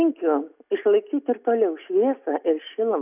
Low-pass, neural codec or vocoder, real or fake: 3.6 kHz; none; real